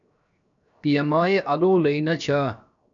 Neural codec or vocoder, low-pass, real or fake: codec, 16 kHz, 0.7 kbps, FocalCodec; 7.2 kHz; fake